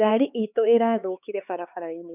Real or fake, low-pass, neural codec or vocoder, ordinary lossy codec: fake; 3.6 kHz; codec, 16 kHz, 4 kbps, X-Codec, HuBERT features, trained on LibriSpeech; none